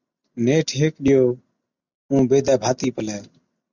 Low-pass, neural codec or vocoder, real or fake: 7.2 kHz; none; real